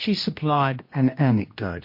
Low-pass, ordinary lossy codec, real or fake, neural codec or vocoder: 5.4 kHz; MP3, 32 kbps; fake; codec, 16 kHz, 1 kbps, X-Codec, HuBERT features, trained on general audio